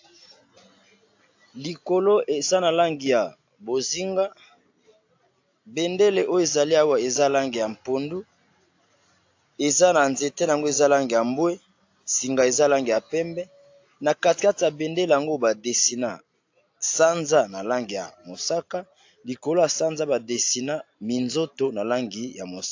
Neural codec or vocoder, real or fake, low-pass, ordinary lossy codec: none; real; 7.2 kHz; AAC, 48 kbps